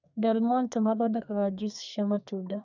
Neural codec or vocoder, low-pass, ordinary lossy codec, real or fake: codec, 32 kHz, 1.9 kbps, SNAC; 7.2 kHz; none; fake